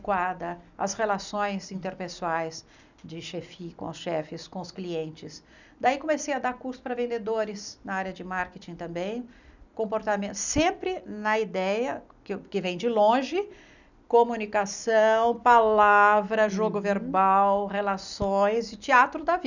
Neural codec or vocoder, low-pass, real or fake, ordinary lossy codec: none; 7.2 kHz; real; none